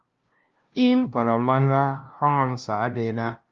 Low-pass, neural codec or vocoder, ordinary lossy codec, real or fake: 7.2 kHz; codec, 16 kHz, 0.5 kbps, FunCodec, trained on LibriTTS, 25 frames a second; Opus, 32 kbps; fake